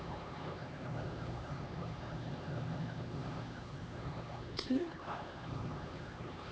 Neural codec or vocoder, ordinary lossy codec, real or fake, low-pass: codec, 16 kHz, 2 kbps, X-Codec, HuBERT features, trained on LibriSpeech; none; fake; none